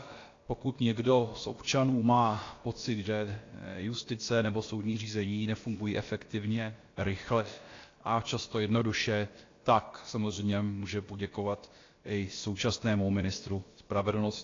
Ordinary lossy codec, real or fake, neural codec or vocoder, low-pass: AAC, 32 kbps; fake; codec, 16 kHz, about 1 kbps, DyCAST, with the encoder's durations; 7.2 kHz